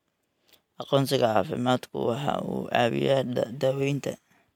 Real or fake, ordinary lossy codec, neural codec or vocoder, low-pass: real; MP3, 96 kbps; none; 19.8 kHz